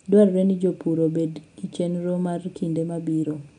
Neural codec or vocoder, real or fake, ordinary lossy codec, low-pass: none; real; none; 9.9 kHz